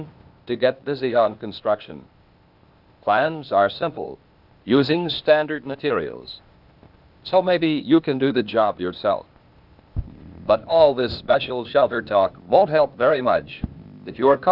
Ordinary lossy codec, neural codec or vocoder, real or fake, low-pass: Opus, 64 kbps; codec, 16 kHz, 0.8 kbps, ZipCodec; fake; 5.4 kHz